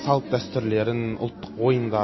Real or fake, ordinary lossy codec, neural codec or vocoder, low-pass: real; MP3, 24 kbps; none; 7.2 kHz